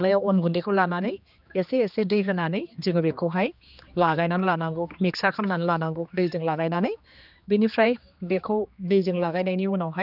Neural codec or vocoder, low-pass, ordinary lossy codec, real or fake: codec, 16 kHz, 2 kbps, X-Codec, HuBERT features, trained on general audio; 5.4 kHz; none; fake